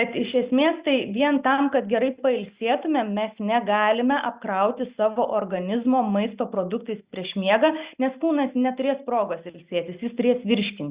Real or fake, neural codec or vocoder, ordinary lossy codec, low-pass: real; none; Opus, 64 kbps; 3.6 kHz